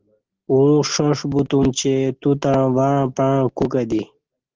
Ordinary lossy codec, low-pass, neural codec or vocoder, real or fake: Opus, 16 kbps; 7.2 kHz; none; real